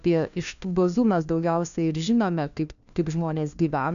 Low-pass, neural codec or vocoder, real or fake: 7.2 kHz; codec, 16 kHz, 1 kbps, FunCodec, trained on LibriTTS, 50 frames a second; fake